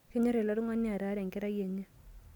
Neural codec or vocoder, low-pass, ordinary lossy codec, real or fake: none; 19.8 kHz; none; real